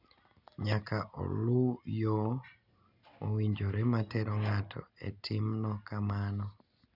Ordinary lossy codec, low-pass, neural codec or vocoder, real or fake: none; 5.4 kHz; none; real